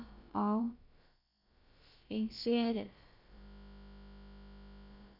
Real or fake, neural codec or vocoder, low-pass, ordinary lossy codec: fake; codec, 16 kHz, about 1 kbps, DyCAST, with the encoder's durations; 5.4 kHz; none